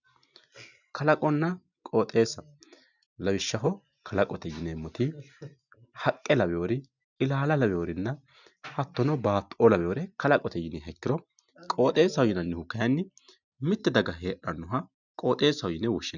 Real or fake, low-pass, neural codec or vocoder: real; 7.2 kHz; none